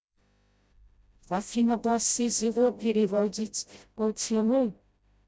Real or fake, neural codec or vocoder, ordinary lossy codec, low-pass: fake; codec, 16 kHz, 0.5 kbps, FreqCodec, smaller model; none; none